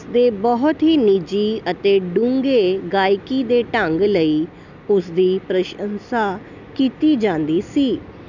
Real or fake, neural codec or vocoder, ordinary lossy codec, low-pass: real; none; none; 7.2 kHz